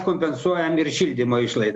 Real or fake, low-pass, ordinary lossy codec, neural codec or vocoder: real; 10.8 kHz; Opus, 64 kbps; none